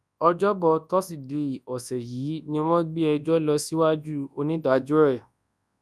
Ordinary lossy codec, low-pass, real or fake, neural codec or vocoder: none; none; fake; codec, 24 kHz, 0.9 kbps, WavTokenizer, large speech release